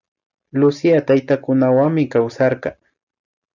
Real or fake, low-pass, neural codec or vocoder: real; 7.2 kHz; none